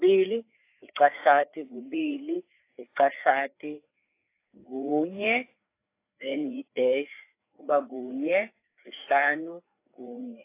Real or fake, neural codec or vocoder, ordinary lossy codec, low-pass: fake; codec, 16 kHz, 4 kbps, FreqCodec, larger model; AAC, 24 kbps; 3.6 kHz